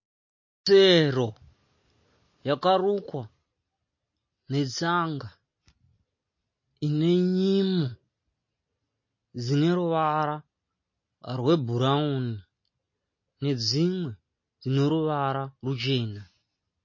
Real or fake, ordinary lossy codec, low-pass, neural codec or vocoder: real; MP3, 32 kbps; 7.2 kHz; none